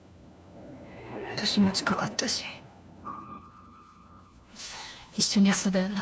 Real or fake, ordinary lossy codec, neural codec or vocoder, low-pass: fake; none; codec, 16 kHz, 1 kbps, FunCodec, trained on LibriTTS, 50 frames a second; none